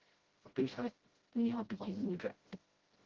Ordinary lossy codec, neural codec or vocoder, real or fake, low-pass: Opus, 16 kbps; codec, 16 kHz, 0.5 kbps, FreqCodec, smaller model; fake; 7.2 kHz